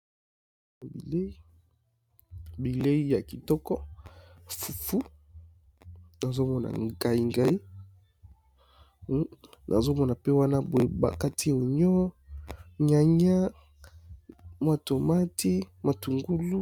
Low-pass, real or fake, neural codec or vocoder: 19.8 kHz; real; none